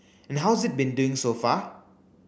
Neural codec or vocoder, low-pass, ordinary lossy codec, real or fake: none; none; none; real